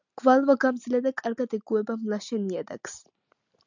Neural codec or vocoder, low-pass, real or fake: none; 7.2 kHz; real